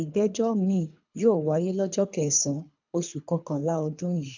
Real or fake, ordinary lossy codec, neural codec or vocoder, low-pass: fake; none; codec, 24 kHz, 3 kbps, HILCodec; 7.2 kHz